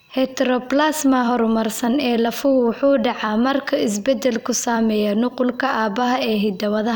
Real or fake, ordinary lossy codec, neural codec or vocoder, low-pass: fake; none; vocoder, 44.1 kHz, 128 mel bands every 256 samples, BigVGAN v2; none